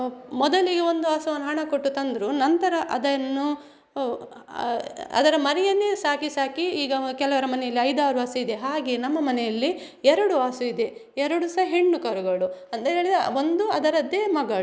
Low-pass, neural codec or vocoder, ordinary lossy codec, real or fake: none; none; none; real